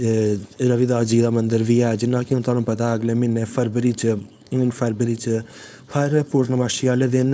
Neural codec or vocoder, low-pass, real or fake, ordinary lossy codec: codec, 16 kHz, 4.8 kbps, FACodec; none; fake; none